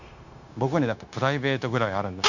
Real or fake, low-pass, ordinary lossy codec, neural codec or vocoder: fake; 7.2 kHz; none; codec, 16 kHz, 0.9 kbps, LongCat-Audio-Codec